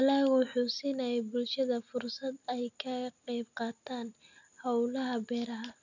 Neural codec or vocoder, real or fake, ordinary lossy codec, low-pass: none; real; none; 7.2 kHz